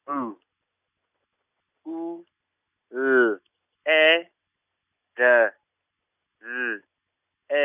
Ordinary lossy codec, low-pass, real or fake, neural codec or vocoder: none; 3.6 kHz; real; none